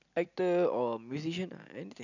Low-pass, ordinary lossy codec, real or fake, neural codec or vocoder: 7.2 kHz; none; real; none